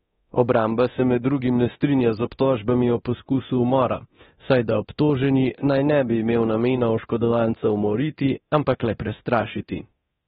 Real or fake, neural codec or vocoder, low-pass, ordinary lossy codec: fake; codec, 24 kHz, 0.9 kbps, DualCodec; 10.8 kHz; AAC, 16 kbps